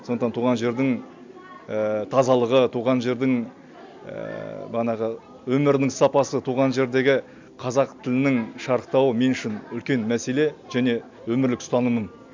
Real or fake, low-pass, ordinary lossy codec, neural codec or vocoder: real; 7.2 kHz; MP3, 64 kbps; none